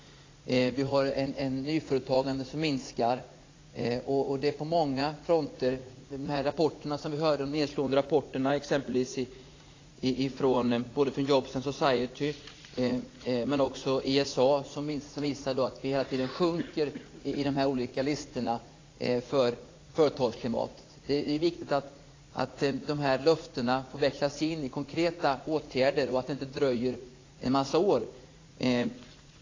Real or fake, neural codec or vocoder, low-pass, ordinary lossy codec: fake; vocoder, 44.1 kHz, 80 mel bands, Vocos; 7.2 kHz; AAC, 32 kbps